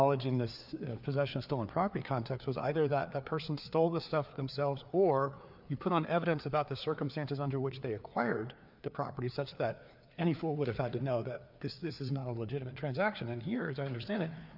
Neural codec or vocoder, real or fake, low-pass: codec, 16 kHz, 4 kbps, FreqCodec, larger model; fake; 5.4 kHz